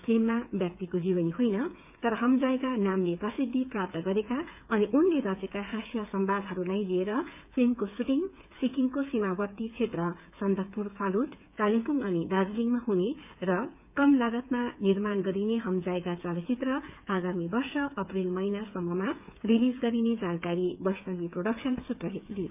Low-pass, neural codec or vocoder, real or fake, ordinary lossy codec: 3.6 kHz; codec, 16 kHz, 8 kbps, FreqCodec, smaller model; fake; none